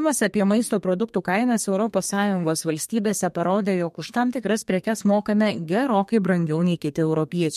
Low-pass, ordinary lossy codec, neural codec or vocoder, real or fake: 14.4 kHz; MP3, 64 kbps; codec, 32 kHz, 1.9 kbps, SNAC; fake